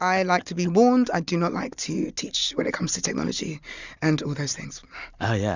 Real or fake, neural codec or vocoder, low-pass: real; none; 7.2 kHz